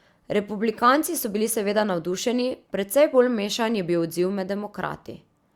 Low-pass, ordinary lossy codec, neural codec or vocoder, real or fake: 19.8 kHz; Opus, 64 kbps; none; real